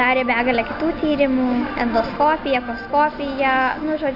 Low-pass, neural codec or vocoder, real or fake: 5.4 kHz; none; real